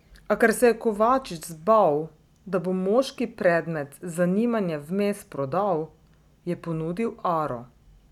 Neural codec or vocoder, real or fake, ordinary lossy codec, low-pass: none; real; none; 19.8 kHz